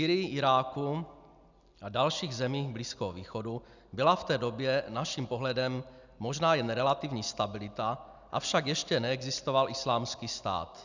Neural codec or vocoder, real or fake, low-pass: none; real; 7.2 kHz